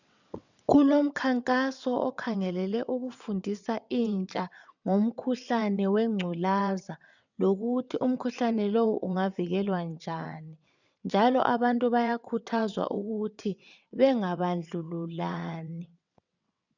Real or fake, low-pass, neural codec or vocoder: fake; 7.2 kHz; vocoder, 22.05 kHz, 80 mel bands, WaveNeXt